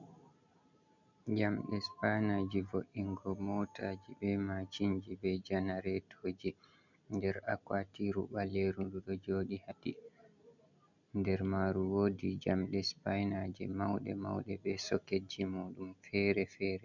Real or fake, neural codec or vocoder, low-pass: real; none; 7.2 kHz